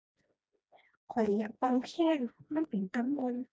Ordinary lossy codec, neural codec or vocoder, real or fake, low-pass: none; codec, 16 kHz, 1 kbps, FreqCodec, smaller model; fake; none